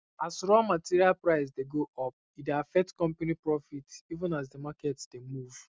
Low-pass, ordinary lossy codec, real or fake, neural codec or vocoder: none; none; real; none